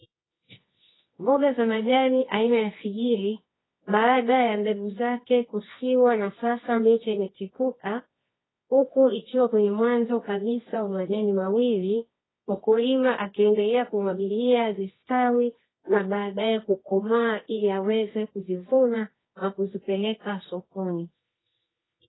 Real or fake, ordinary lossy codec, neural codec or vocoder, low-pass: fake; AAC, 16 kbps; codec, 24 kHz, 0.9 kbps, WavTokenizer, medium music audio release; 7.2 kHz